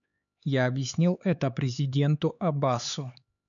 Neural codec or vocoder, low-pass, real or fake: codec, 16 kHz, 4 kbps, X-Codec, HuBERT features, trained on LibriSpeech; 7.2 kHz; fake